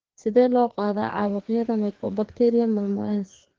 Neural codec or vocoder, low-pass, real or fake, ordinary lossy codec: codec, 16 kHz, 2 kbps, FreqCodec, larger model; 7.2 kHz; fake; Opus, 16 kbps